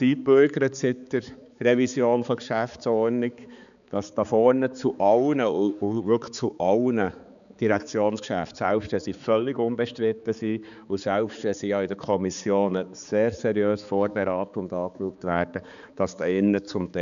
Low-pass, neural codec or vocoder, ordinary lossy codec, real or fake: 7.2 kHz; codec, 16 kHz, 4 kbps, X-Codec, HuBERT features, trained on balanced general audio; none; fake